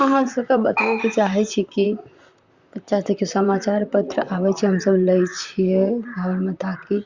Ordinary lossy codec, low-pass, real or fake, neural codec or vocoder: Opus, 64 kbps; 7.2 kHz; fake; vocoder, 44.1 kHz, 128 mel bands, Pupu-Vocoder